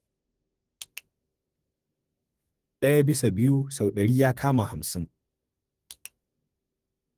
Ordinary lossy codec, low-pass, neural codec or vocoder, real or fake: Opus, 24 kbps; 14.4 kHz; codec, 32 kHz, 1.9 kbps, SNAC; fake